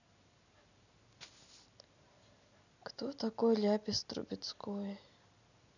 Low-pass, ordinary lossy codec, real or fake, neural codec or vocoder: 7.2 kHz; none; real; none